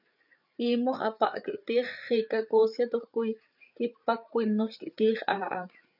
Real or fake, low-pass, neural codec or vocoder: fake; 5.4 kHz; codec, 16 kHz, 8 kbps, FreqCodec, larger model